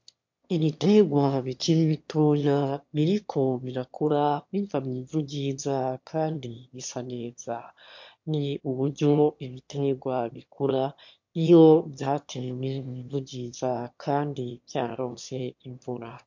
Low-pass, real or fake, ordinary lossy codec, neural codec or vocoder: 7.2 kHz; fake; MP3, 48 kbps; autoencoder, 22.05 kHz, a latent of 192 numbers a frame, VITS, trained on one speaker